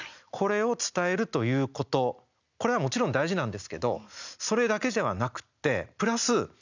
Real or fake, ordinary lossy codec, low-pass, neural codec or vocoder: real; none; 7.2 kHz; none